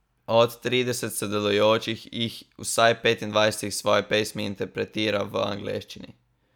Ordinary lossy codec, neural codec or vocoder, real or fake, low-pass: none; none; real; 19.8 kHz